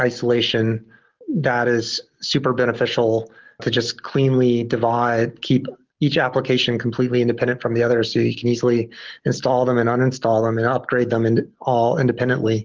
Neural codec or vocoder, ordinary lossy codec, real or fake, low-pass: none; Opus, 16 kbps; real; 7.2 kHz